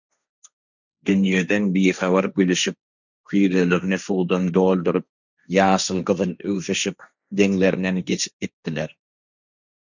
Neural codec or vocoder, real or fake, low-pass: codec, 16 kHz, 1.1 kbps, Voila-Tokenizer; fake; 7.2 kHz